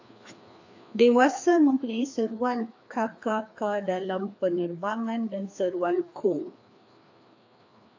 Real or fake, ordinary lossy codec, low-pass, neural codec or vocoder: fake; AAC, 48 kbps; 7.2 kHz; codec, 16 kHz, 2 kbps, FreqCodec, larger model